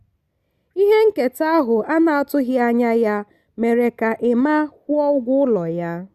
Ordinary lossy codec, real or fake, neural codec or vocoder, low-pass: Opus, 64 kbps; real; none; 14.4 kHz